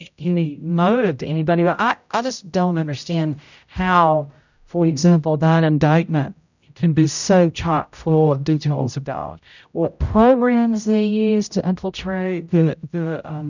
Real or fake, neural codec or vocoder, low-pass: fake; codec, 16 kHz, 0.5 kbps, X-Codec, HuBERT features, trained on general audio; 7.2 kHz